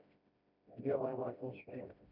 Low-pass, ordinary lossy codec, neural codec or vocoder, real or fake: 5.4 kHz; none; codec, 16 kHz, 1 kbps, FreqCodec, smaller model; fake